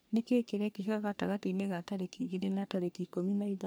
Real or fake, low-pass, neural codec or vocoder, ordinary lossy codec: fake; none; codec, 44.1 kHz, 3.4 kbps, Pupu-Codec; none